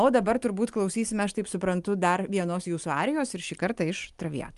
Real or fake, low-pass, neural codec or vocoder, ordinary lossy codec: real; 10.8 kHz; none; Opus, 32 kbps